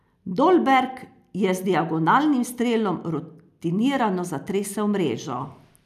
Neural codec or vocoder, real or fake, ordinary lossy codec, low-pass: none; real; none; 14.4 kHz